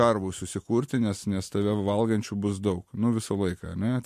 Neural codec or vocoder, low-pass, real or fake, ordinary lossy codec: none; 14.4 kHz; real; MP3, 64 kbps